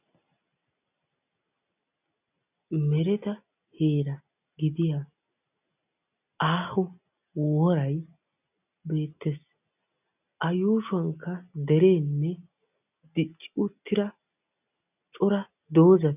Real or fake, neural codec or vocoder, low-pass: real; none; 3.6 kHz